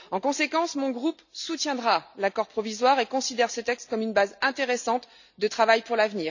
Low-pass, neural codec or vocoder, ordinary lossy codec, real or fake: 7.2 kHz; none; none; real